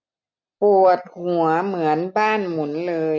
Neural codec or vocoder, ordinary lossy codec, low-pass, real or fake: none; none; 7.2 kHz; real